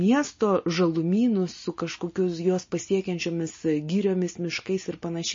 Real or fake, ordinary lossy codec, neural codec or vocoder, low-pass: real; MP3, 32 kbps; none; 7.2 kHz